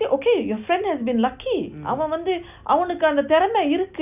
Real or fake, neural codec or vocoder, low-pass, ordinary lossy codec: real; none; 3.6 kHz; none